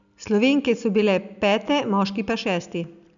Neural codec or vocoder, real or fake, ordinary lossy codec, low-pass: none; real; none; 7.2 kHz